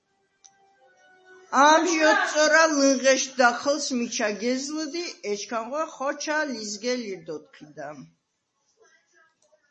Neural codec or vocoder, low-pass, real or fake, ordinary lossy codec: none; 10.8 kHz; real; MP3, 32 kbps